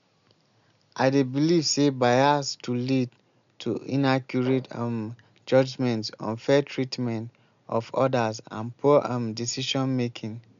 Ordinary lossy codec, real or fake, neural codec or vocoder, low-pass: MP3, 64 kbps; real; none; 7.2 kHz